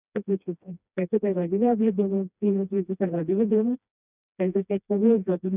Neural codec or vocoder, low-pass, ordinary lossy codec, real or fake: codec, 16 kHz, 1 kbps, FreqCodec, smaller model; 3.6 kHz; none; fake